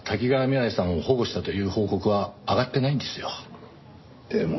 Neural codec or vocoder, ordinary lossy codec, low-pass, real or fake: none; MP3, 24 kbps; 7.2 kHz; real